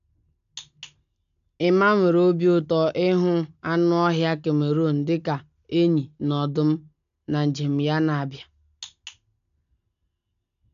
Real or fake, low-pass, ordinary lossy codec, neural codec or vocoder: real; 7.2 kHz; none; none